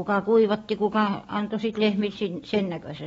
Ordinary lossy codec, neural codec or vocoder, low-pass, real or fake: AAC, 24 kbps; vocoder, 44.1 kHz, 128 mel bands every 512 samples, BigVGAN v2; 19.8 kHz; fake